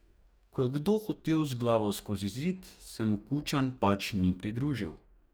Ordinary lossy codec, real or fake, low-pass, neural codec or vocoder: none; fake; none; codec, 44.1 kHz, 2.6 kbps, DAC